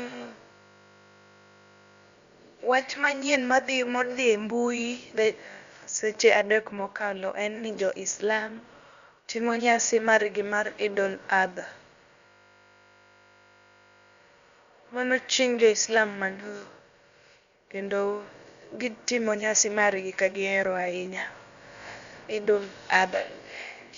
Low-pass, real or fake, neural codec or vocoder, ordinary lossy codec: 7.2 kHz; fake; codec, 16 kHz, about 1 kbps, DyCAST, with the encoder's durations; Opus, 64 kbps